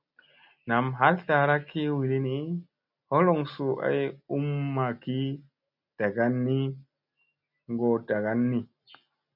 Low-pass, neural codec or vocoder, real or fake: 5.4 kHz; none; real